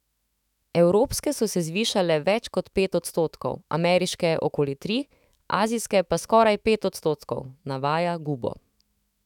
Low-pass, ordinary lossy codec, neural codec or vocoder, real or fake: 19.8 kHz; none; autoencoder, 48 kHz, 128 numbers a frame, DAC-VAE, trained on Japanese speech; fake